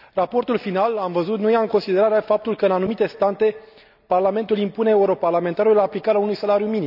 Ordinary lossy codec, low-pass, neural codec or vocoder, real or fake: none; 5.4 kHz; none; real